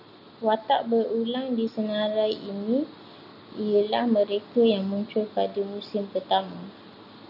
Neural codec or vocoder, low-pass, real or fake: none; 5.4 kHz; real